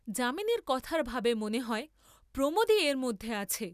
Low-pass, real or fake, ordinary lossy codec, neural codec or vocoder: 14.4 kHz; real; none; none